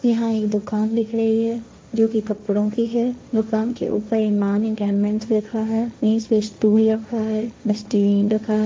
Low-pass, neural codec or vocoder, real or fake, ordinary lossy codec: none; codec, 16 kHz, 1.1 kbps, Voila-Tokenizer; fake; none